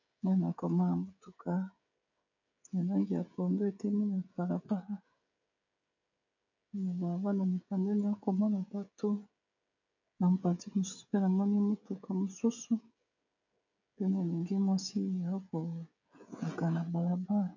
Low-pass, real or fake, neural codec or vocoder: 7.2 kHz; fake; codec, 16 kHz, 16 kbps, FreqCodec, smaller model